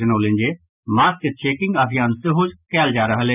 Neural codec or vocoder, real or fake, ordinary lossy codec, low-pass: none; real; none; 3.6 kHz